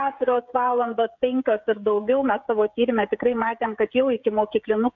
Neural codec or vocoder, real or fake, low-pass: codec, 16 kHz, 16 kbps, FreqCodec, smaller model; fake; 7.2 kHz